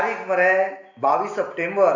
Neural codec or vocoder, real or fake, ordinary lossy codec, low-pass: none; real; AAC, 48 kbps; 7.2 kHz